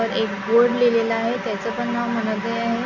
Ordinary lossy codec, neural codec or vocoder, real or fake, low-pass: none; none; real; 7.2 kHz